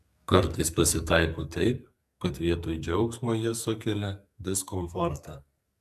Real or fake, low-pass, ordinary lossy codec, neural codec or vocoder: fake; 14.4 kHz; AAC, 96 kbps; codec, 44.1 kHz, 2.6 kbps, SNAC